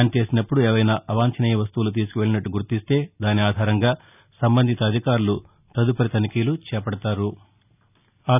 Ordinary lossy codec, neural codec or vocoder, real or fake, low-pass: none; none; real; 3.6 kHz